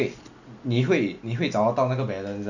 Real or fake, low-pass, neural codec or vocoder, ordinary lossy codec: real; 7.2 kHz; none; none